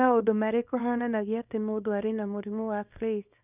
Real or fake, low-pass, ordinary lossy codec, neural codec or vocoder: fake; 3.6 kHz; none; codec, 24 kHz, 0.9 kbps, WavTokenizer, small release